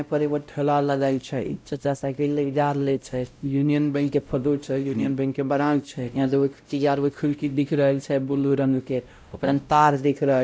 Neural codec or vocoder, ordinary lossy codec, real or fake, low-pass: codec, 16 kHz, 0.5 kbps, X-Codec, WavLM features, trained on Multilingual LibriSpeech; none; fake; none